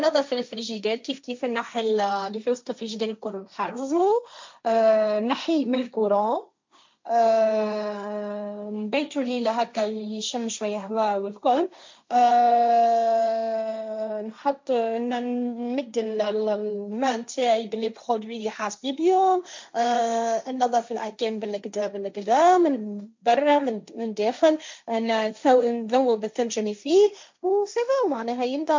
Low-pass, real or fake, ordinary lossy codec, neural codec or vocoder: 7.2 kHz; fake; MP3, 64 kbps; codec, 16 kHz, 1.1 kbps, Voila-Tokenizer